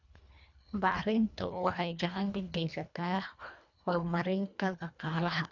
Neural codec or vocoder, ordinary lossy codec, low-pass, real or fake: codec, 24 kHz, 1.5 kbps, HILCodec; none; 7.2 kHz; fake